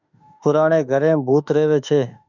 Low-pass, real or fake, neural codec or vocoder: 7.2 kHz; fake; autoencoder, 48 kHz, 32 numbers a frame, DAC-VAE, trained on Japanese speech